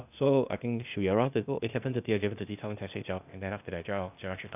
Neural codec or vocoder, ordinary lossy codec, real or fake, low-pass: codec, 16 kHz, 0.8 kbps, ZipCodec; none; fake; 3.6 kHz